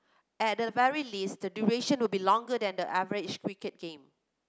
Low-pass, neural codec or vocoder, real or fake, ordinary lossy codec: none; none; real; none